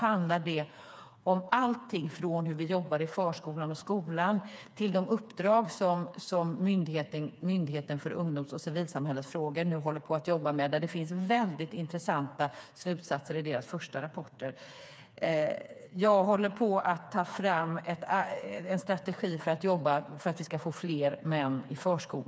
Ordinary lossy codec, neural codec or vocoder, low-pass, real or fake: none; codec, 16 kHz, 4 kbps, FreqCodec, smaller model; none; fake